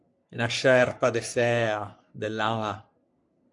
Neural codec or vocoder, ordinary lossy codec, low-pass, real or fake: codec, 44.1 kHz, 3.4 kbps, Pupu-Codec; AAC, 64 kbps; 10.8 kHz; fake